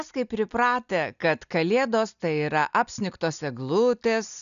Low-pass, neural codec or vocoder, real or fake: 7.2 kHz; none; real